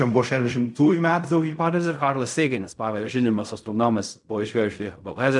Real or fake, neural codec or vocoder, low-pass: fake; codec, 16 kHz in and 24 kHz out, 0.4 kbps, LongCat-Audio-Codec, fine tuned four codebook decoder; 10.8 kHz